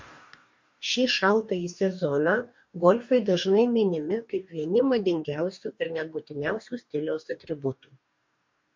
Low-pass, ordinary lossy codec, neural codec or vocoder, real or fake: 7.2 kHz; MP3, 48 kbps; codec, 44.1 kHz, 2.6 kbps, DAC; fake